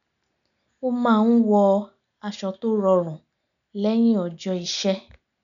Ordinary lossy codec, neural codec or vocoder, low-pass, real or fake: none; none; 7.2 kHz; real